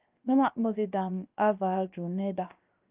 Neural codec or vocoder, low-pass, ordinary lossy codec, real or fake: codec, 16 kHz, 0.7 kbps, FocalCodec; 3.6 kHz; Opus, 32 kbps; fake